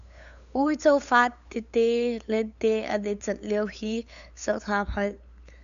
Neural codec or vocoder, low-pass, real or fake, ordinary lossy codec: codec, 16 kHz, 8 kbps, FunCodec, trained on LibriTTS, 25 frames a second; 7.2 kHz; fake; MP3, 96 kbps